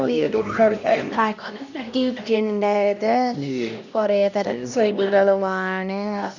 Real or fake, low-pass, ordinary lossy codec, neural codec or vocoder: fake; 7.2 kHz; none; codec, 16 kHz, 1 kbps, X-Codec, HuBERT features, trained on LibriSpeech